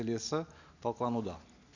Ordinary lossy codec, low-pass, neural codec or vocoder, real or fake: none; 7.2 kHz; none; real